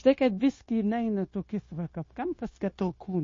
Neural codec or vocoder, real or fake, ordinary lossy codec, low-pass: codec, 16 kHz, 0.9 kbps, LongCat-Audio-Codec; fake; MP3, 32 kbps; 7.2 kHz